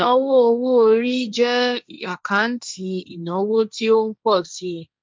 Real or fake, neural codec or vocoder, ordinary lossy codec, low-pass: fake; codec, 16 kHz, 1.1 kbps, Voila-Tokenizer; none; none